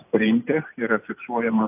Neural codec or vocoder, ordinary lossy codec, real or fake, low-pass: none; AAC, 32 kbps; real; 3.6 kHz